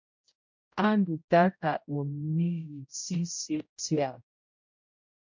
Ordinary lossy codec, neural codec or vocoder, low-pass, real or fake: MP3, 48 kbps; codec, 16 kHz, 0.5 kbps, X-Codec, HuBERT features, trained on general audio; 7.2 kHz; fake